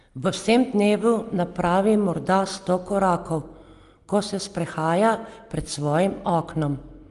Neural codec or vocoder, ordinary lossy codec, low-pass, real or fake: none; Opus, 32 kbps; 10.8 kHz; real